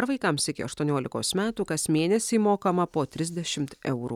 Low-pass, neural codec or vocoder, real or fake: 19.8 kHz; none; real